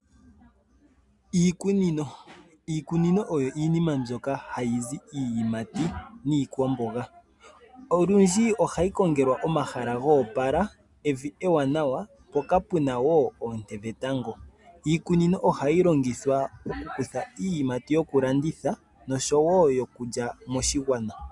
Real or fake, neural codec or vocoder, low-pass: real; none; 10.8 kHz